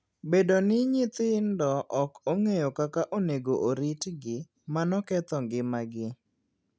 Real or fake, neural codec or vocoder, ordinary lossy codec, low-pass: real; none; none; none